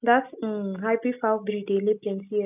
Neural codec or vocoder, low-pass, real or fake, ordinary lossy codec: none; 3.6 kHz; real; none